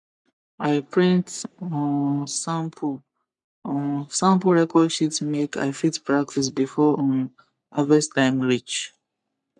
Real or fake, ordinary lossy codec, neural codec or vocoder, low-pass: fake; none; codec, 44.1 kHz, 3.4 kbps, Pupu-Codec; 10.8 kHz